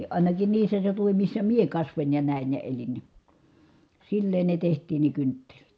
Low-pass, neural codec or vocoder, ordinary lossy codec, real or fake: none; none; none; real